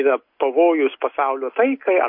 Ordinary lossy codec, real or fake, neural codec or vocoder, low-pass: MP3, 32 kbps; real; none; 5.4 kHz